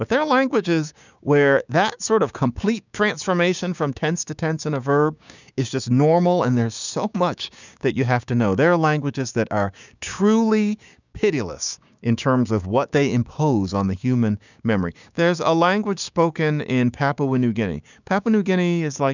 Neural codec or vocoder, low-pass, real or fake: codec, 16 kHz, 6 kbps, DAC; 7.2 kHz; fake